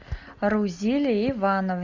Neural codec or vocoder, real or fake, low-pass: none; real; 7.2 kHz